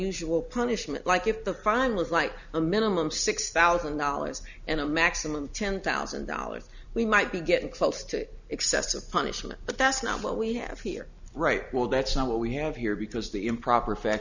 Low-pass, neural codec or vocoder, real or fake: 7.2 kHz; none; real